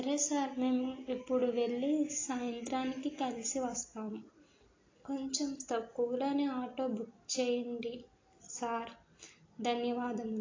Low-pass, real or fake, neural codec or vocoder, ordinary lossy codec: 7.2 kHz; real; none; AAC, 32 kbps